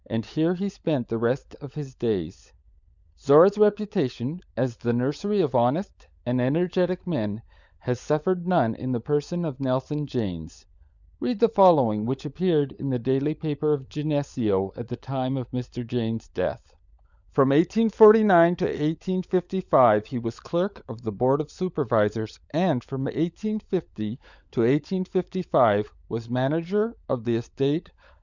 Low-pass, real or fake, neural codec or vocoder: 7.2 kHz; fake; codec, 16 kHz, 16 kbps, FunCodec, trained on LibriTTS, 50 frames a second